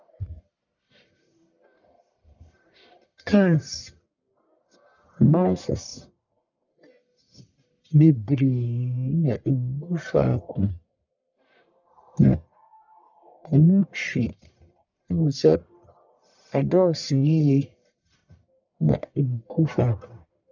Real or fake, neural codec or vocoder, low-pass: fake; codec, 44.1 kHz, 1.7 kbps, Pupu-Codec; 7.2 kHz